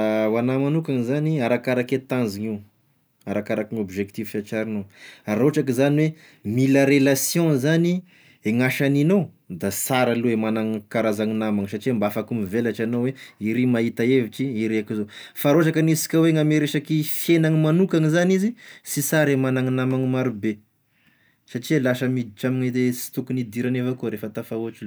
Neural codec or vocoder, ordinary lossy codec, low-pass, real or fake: none; none; none; real